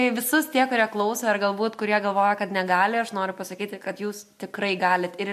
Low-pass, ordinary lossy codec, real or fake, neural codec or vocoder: 14.4 kHz; MP3, 64 kbps; real; none